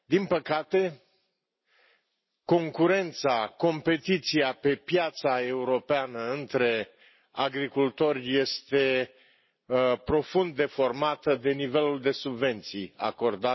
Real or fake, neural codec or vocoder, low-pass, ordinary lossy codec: real; none; 7.2 kHz; MP3, 24 kbps